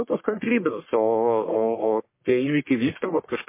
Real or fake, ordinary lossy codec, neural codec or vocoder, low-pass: fake; MP3, 24 kbps; codec, 44.1 kHz, 1.7 kbps, Pupu-Codec; 3.6 kHz